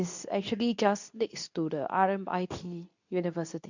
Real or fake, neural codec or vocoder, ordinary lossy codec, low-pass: fake; codec, 24 kHz, 0.9 kbps, WavTokenizer, medium speech release version 2; none; 7.2 kHz